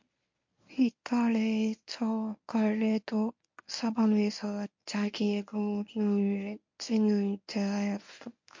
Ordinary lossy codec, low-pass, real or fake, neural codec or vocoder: MP3, 48 kbps; 7.2 kHz; fake; codec, 24 kHz, 0.9 kbps, WavTokenizer, medium speech release version 1